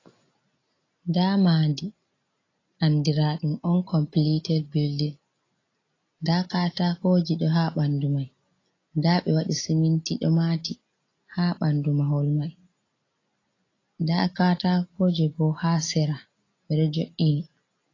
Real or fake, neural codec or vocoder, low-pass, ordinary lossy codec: real; none; 7.2 kHz; AAC, 32 kbps